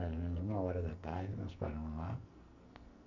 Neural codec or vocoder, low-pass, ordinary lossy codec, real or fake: codec, 44.1 kHz, 2.6 kbps, SNAC; 7.2 kHz; none; fake